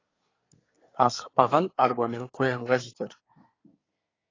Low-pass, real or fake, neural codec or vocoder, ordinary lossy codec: 7.2 kHz; fake; codec, 24 kHz, 1 kbps, SNAC; AAC, 32 kbps